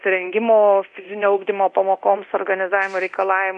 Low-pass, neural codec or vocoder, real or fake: 10.8 kHz; codec, 24 kHz, 0.9 kbps, DualCodec; fake